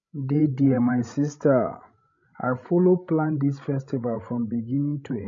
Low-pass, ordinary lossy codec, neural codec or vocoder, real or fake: 7.2 kHz; MP3, 48 kbps; codec, 16 kHz, 16 kbps, FreqCodec, larger model; fake